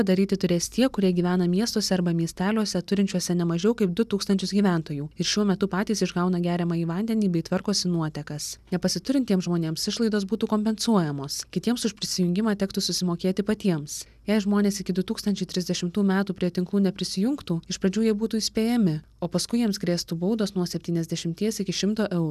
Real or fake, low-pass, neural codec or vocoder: real; 14.4 kHz; none